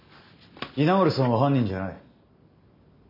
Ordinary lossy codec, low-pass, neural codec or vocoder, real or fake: none; 5.4 kHz; none; real